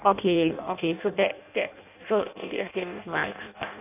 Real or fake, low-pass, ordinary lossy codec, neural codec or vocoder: fake; 3.6 kHz; none; codec, 16 kHz in and 24 kHz out, 0.6 kbps, FireRedTTS-2 codec